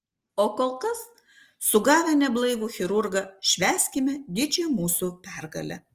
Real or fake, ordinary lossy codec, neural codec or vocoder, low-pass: real; Opus, 24 kbps; none; 14.4 kHz